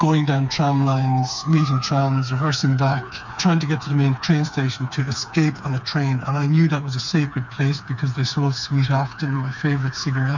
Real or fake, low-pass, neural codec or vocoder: fake; 7.2 kHz; codec, 16 kHz, 4 kbps, FreqCodec, smaller model